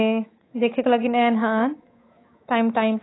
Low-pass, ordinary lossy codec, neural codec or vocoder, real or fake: 7.2 kHz; AAC, 16 kbps; codec, 24 kHz, 3.1 kbps, DualCodec; fake